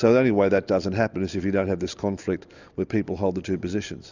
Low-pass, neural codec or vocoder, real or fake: 7.2 kHz; none; real